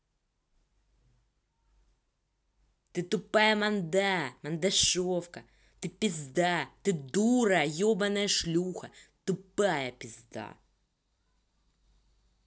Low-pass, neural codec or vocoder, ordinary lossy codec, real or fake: none; none; none; real